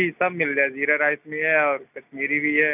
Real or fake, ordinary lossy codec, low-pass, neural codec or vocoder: real; none; 3.6 kHz; none